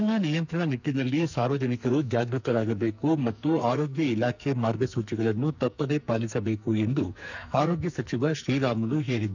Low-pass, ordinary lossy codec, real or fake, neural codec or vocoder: 7.2 kHz; none; fake; codec, 44.1 kHz, 2.6 kbps, SNAC